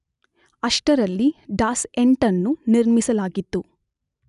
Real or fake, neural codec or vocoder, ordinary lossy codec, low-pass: real; none; none; 10.8 kHz